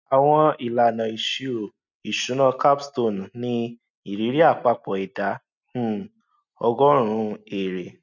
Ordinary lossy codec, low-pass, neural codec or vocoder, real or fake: none; 7.2 kHz; none; real